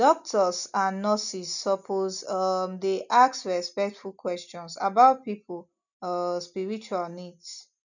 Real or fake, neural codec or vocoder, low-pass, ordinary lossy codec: real; none; 7.2 kHz; none